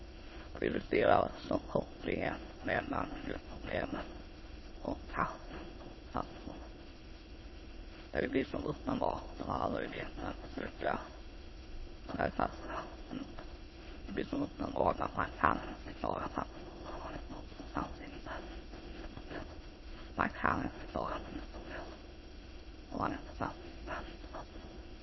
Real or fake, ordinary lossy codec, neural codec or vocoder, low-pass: fake; MP3, 24 kbps; autoencoder, 22.05 kHz, a latent of 192 numbers a frame, VITS, trained on many speakers; 7.2 kHz